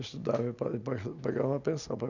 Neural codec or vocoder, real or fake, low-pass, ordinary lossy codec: none; real; 7.2 kHz; none